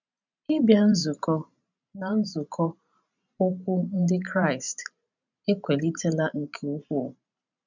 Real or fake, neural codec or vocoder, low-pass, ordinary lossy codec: fake; vocoder, 44.1 kHz, 128 mel bands every 512 samples, BigVGAN v2; 7.2 kHz; none